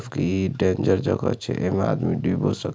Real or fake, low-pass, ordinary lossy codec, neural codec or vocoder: real; none; none; none